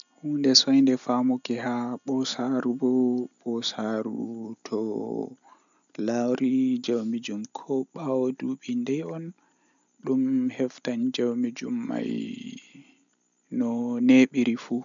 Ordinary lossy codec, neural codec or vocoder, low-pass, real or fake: none; none; 7.2 kHz; real